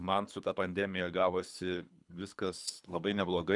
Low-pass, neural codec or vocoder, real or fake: 10.8 kHz; codec, 24 kHz, 3 kbps, HILCodec; fake